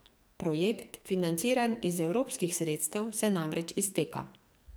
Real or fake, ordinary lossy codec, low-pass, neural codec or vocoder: fake; none; none; codec, 44.1 kHz, 2.6 kbps, SNAC